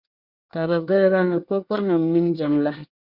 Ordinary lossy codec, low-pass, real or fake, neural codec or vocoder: Opus, 64 kbps; 5.4 kHz; fake; codec, 24 kHz, 1 kbps, SNAC